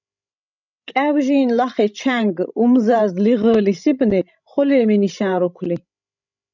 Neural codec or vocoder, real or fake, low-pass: codec, 16 kHz, 16 kbps, FreqCodec, larger model; fake; 7.2 kHz